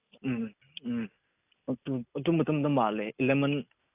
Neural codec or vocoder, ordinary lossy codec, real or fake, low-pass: none; AAC, 32 kbps; real; 3.6 kHz